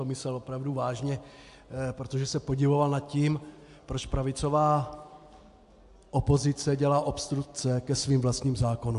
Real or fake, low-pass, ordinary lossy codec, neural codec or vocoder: real; 10.8 kHz; AAC, 64 kbps; none